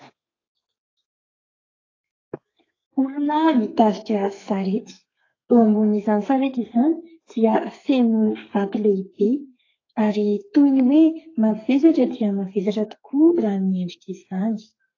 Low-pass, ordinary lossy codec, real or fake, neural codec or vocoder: 7.2 kHz; AAC, 32 kbps; fake; codec, 32 kHz, 1.9 kbps, SNAC